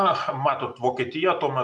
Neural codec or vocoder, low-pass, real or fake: none; 10.8 kHz; real